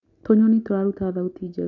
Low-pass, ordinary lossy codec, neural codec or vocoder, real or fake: 7.2 kHz; none; none; real